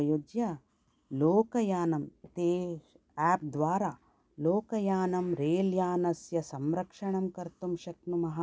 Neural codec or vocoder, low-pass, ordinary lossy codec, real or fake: none; none; none; real